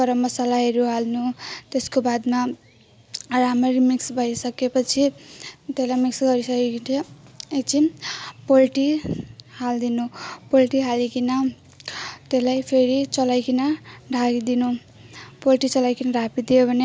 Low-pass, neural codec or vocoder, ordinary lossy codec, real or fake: none; none; none; real